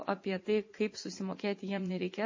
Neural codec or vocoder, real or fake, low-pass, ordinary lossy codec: vocoder, 44.1 kHz, 80 mel bands, Vocos; fake; 7.2 kHz; MP3, 32 kbps